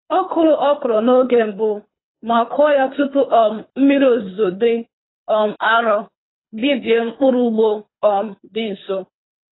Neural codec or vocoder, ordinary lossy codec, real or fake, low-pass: codec, 24 kHz, 3 kbps, HILCodec; AAC, 16 kbps; fake; 7.2 kHz